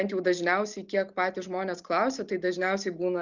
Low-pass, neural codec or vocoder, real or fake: 7.2 kHz; none; real